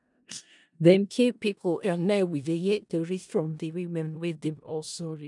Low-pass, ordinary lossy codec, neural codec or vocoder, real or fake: 10.8 kHz; none; codec, 16 kHz in and 24 kHz out, 0.4 kbps, LongCat-Audio-Codec, four codebook decoder; fake